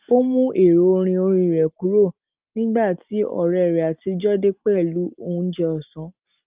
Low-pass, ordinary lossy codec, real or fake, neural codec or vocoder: 3.6 kHz; Opus, 24 kbps; real; none